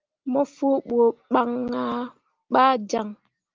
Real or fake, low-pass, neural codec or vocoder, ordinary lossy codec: real; 7.2 kHz; none; Opus, 24 kbps